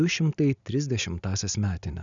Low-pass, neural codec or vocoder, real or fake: 7.2 kHz; none; real